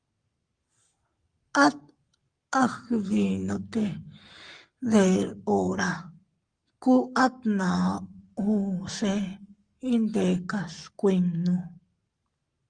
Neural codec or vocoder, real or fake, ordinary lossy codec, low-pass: codec, 44.1 kHz, 7.8 kbps, Pupu-Codec; fake; Opus, 32 kbps; 9.9 kHz